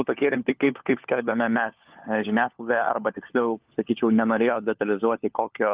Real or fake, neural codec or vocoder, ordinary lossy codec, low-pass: fake; codec, 16 kHz, 4 kbps, FunCodec, trained on LibriTTS, 50 frames a second; Opus, 24 kbps; 3.6 kHz